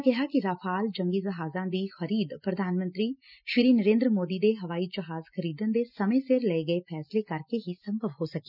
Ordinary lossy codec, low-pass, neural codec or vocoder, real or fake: none; 5.4 kHz; none; real